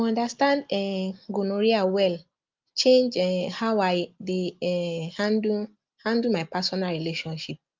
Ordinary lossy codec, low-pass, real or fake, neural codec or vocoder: Opus, 32 kbps; 7.2 kHz; real; none